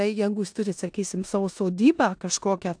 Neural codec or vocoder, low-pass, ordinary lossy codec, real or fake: codec, 16 kHz in and 24 kHz out, 0.9 kbps, LongCat-Audio-Codec, four codebook decoder; 9.9 kHz; AAC, 64 kbps; fake